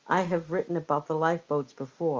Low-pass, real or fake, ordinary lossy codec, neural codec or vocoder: 7.2 kHz; real; Opus, 32 kbps; none